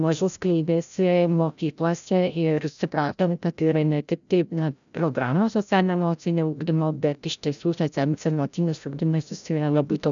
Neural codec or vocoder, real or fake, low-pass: codec, 16 kHz, 0.5 kbps, FreqCodec, larger model; fake; 7.2 kHz